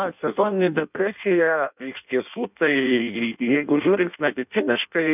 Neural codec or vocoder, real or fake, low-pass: codec, 16 kHz in and 24 kHz out, 0.6 kbps, FireRedTTS-2 codec; fake; 3.6 kHz